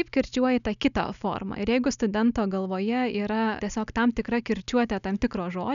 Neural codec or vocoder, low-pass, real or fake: none; 7.2 kHz; real